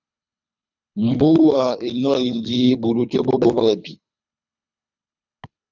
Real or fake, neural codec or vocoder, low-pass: fake; codec, 24 kHz, 3 kbps, HILCodec; 7.2 kHz